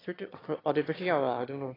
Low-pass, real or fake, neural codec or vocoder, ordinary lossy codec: 5.4 kHz; fake; autoencoder, 22.05 kHz, a latent of 192 numbers a frame, VITS, trained on one speaker; AAC, 24 kbps